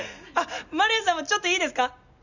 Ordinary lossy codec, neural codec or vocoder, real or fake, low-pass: none; none; real; 7.2 kHz